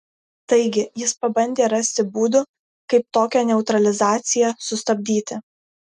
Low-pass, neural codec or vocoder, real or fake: 10.8 kHz; none; real